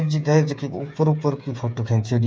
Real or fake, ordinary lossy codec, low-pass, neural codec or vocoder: fake; none; none; codec, 16 kHz, 8 kbps, FreqCodec, smaller model